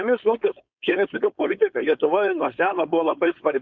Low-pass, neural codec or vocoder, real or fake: 7.2 kHz; codec, 16 kHz, 4.8 kbps, FACodec; fake